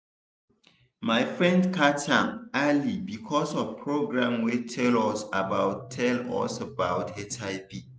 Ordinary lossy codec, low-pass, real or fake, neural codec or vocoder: none; none; real; none